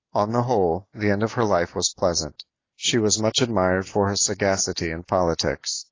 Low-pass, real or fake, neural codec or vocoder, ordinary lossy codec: 7.2 kHz; real; none; AAC, 32 kbps